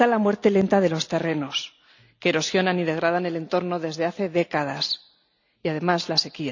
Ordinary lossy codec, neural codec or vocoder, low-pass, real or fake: none; none; 7.2 kHz; real